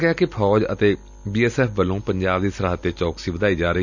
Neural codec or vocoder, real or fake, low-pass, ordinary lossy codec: none; real; 7.2 kHz; none